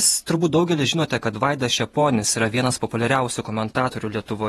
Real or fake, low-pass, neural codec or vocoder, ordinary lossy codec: fake; 19.8 kHz; vocoder, 48 kHz, 128 mel bands, Vocos; AAC, 32 kbps